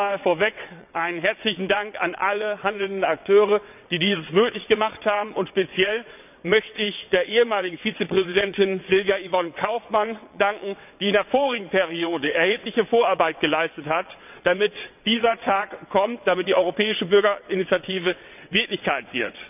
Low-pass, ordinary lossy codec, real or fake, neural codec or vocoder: 3.6 kHz; none; fake; vocoder, 22.05 kHz, 80 mel bands, WaveNeXt